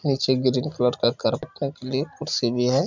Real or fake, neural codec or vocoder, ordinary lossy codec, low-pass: real; none; none; 7.2 kHz